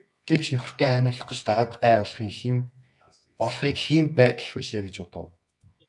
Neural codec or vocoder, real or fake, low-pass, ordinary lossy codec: codec, 24 kHz, 0.9 kbps, WavTokenizer, medium music audio release; fake; 10.8 kHz; MP3, 96 kbps